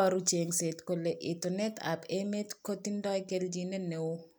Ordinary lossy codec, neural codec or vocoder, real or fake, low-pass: none; none; real; none